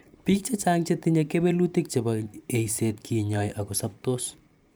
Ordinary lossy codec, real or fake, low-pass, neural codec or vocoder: none; fake; none; vocoder, 44.1 kHz, 128 mel bands every 512 samples, BigVGAN v2